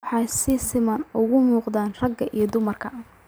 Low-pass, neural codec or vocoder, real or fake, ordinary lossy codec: none; vocoder, 44.1 kHz, 128 mel bands every 512 samples, BigVGAN v2; fake; none